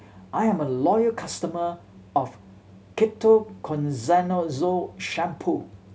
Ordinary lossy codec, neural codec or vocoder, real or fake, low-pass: none; none; real; none